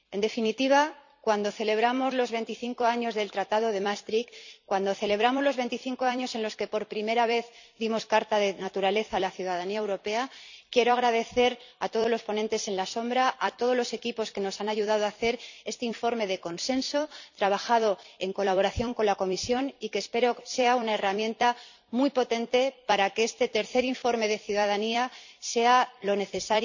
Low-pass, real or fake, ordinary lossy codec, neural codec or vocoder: 7.2 kHz; fake; none; vocoder, 44.1 kHz, 128 mel bands every 256 samples, BigVGAN v2